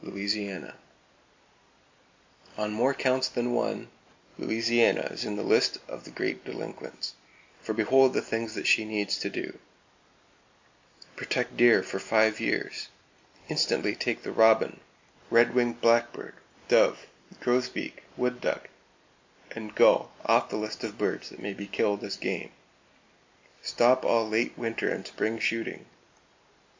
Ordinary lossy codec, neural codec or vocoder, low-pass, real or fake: MP3, 64 kbps; none; 7.2 kHz; real